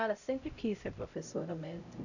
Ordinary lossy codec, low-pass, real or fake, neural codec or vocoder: none; 7.2 kHz; fake; codec, 16 kHz, 0.5 kbps, X-Codec, HuBERT features, trained on LibriSpeech